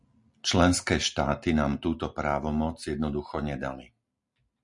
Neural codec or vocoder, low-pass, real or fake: none; 10.8 kHz; real